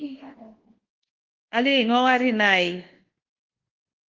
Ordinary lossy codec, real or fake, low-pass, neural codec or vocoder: Opus, 16 kbps; fake; 7.2 kHz; codec, 24 kHz, 1.2 kbps, DualCodec